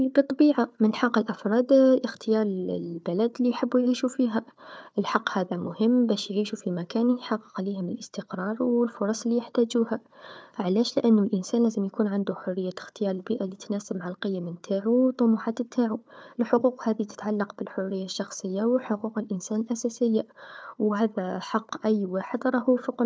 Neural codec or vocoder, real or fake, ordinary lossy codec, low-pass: codec, 16 kHz, 4 kbps, FunCodec, trained on Chinese and English, 50 frames a second; fake; none; none